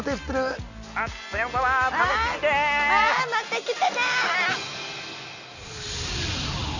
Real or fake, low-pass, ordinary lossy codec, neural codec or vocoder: real; 7.2 kHz; none; none